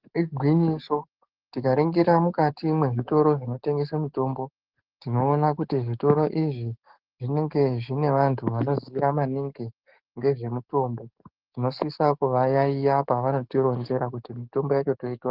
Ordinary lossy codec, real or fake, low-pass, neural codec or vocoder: Opus, 16 kbps; fake; 5.4 kHz; codec, 44.1 kHz, 7.8 kbps, DAC